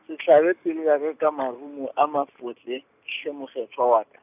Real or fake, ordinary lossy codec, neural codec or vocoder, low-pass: real; none; none; 3.6 kHz